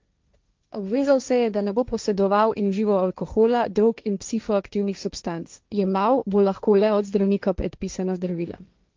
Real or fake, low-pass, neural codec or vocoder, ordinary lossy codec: fake; 7.2 kHz; codec, 16 kHz, 1.1 kbps, Voila-Tokenizer; Opus, 24 kbps